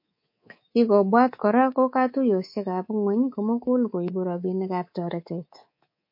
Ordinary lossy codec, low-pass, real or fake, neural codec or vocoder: MP3, 32 kbps; 5.4 kHz; fake; codec, 24 kHz, 3.1 kbps, DualCodec